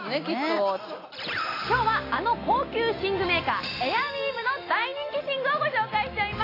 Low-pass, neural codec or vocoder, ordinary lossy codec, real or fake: 5.4 kHz; none; AAC, 24 kbps; real